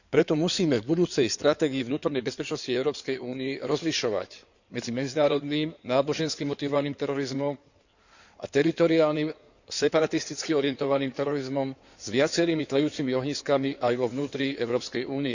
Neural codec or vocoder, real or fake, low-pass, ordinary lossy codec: codec, 16 kHz in and 24 kHz out, 2.2 kbps, FireRedTTS-2 codec; fake; 7.2 kHz; none